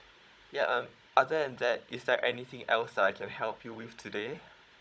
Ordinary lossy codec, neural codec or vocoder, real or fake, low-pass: none; codec, 16 kHz, 16 kbps, FunCodec, trained on Chinese and English, 50 frames a second; fake; none